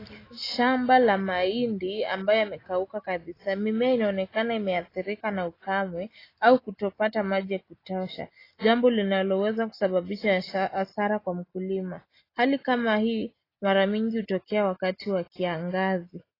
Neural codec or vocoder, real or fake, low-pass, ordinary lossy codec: none; real; 5.4 kHz; AAC, 24 kbps